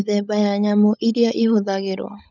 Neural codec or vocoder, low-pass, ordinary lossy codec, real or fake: codec, 16 kHz, 16 kbps, FunCodec, trained on LibriTTS, 50 frames a second; 7.2 kHz; none; fake